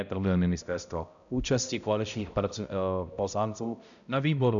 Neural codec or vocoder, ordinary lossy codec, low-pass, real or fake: codec, 16 kHz, 0.5 kbps, X-Codec, HuBERT features, trained on balanced general audio; MP3, 96 kbps; 7.2 kHz; fake